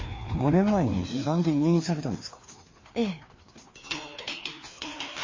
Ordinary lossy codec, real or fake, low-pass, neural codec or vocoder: MP3, 32 kbps; fake; 7.2 kHz; codec, 16 kHz, 2 kbps, FreqCodec, larger model